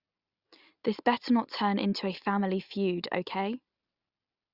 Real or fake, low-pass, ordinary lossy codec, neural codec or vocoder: real; 5.4 kHz; Opus, 64 kbps; none